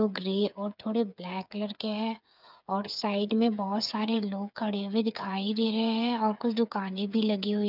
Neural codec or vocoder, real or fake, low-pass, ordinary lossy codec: codec, 16 kHz, 8 kbps, FreqCodec, smaller model; fake; 5.4 kHz; none